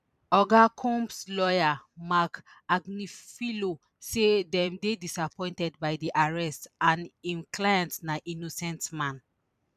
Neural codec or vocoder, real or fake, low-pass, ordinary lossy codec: vocoder, 44.1 kHz, 128 mel bands every 256 samples, BigVGAN v2; fake; 14.4 kHz; none